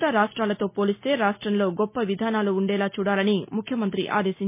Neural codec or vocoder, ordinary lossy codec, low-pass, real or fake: none; MP3, 24 kbps; 3.6 kHz; real